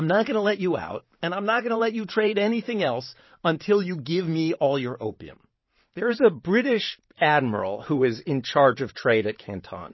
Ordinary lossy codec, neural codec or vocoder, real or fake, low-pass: MP3, 24 kbps; vocoder, 44.1 kHz, 128 mel bands every 512 samples, BigVGAN v2; fake; 7.2 kHz